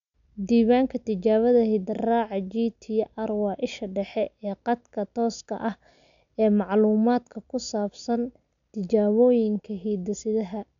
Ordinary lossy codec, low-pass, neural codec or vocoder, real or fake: none; 7.2 kHz; none; real